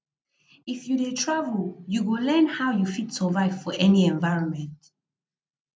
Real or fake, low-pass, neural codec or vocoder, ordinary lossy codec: real; none; none; none